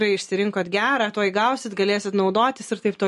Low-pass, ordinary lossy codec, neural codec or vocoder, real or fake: 14.4 kHz; MP3, 48 kbps; none; real